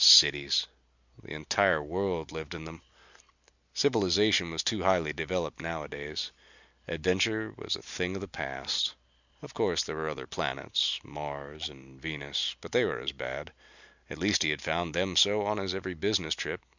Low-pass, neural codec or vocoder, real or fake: 7.2 kHz; none; real